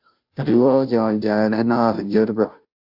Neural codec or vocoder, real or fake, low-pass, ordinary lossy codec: codec, 16 kHz, 0.5 kbps, FunCodec, trained on Chinese and English, 25 frames a second; fake; 5.4 kHz; AAC, 48 kbps